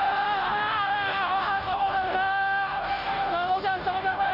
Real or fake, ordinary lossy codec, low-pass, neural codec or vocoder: fake; none; 5.4 kHz; codec, 16 kHz, 0.5 kbps, FunCodec, trained on Chinese and English, 25 frames a second